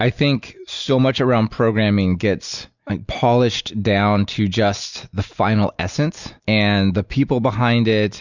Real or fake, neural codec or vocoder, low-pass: real; none; 7.2 kHz